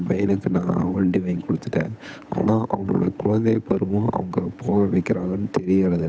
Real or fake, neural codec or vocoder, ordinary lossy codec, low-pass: fake; codec, 16 kHz, 8 kbps, FunCodec, trained on Chinese and English, 25 frames a second; none; none